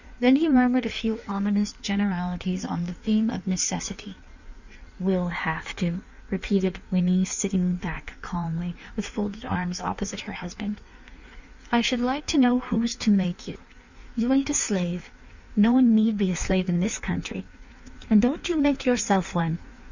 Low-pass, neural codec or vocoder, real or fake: 7.2 kHz; codec, 16 kHz in and 24 kHz out, 1.1 kbps, FireRedTTS-2 codec; fake